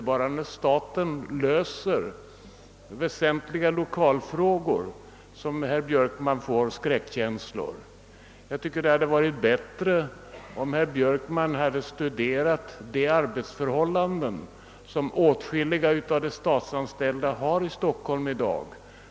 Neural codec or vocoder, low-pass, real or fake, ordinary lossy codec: none; none; real; none